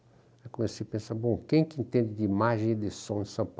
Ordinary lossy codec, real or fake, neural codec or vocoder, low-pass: none; real; none; none